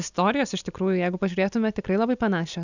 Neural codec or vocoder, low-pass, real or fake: none; 7.2 kHz; real